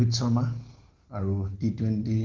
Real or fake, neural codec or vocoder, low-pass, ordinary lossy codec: real; none; 7.2 kHz; Opus, 16 kbps